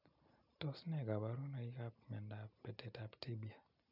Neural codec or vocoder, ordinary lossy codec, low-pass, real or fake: none; none; 5.4 kHz; real